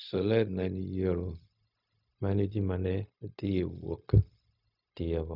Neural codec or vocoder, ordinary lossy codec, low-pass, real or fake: codec, 16 kHz, 0.4 kbps, LongCat-Audio-Codec; none; 5.4 kHz; fake